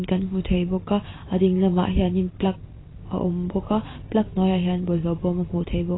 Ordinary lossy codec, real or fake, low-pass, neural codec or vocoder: AAC, 16 kbps; real; 7.2 kHz; none